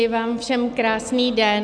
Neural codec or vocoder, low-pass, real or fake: none; 9.9 kHz; real